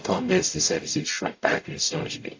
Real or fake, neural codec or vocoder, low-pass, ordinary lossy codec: fake; codec, 44.1 kHz, 0.9 kbps, DAC; 7.2 kHz; AAC, 48 kbps